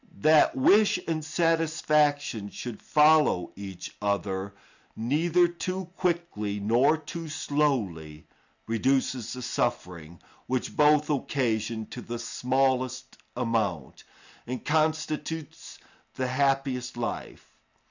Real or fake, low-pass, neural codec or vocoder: real; 7.2 kHz; none